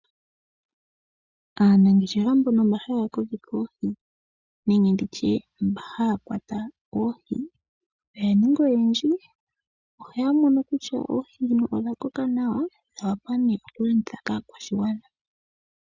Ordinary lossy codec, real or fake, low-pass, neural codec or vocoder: Opus, 64 kbps; real; 7.2 kHz; none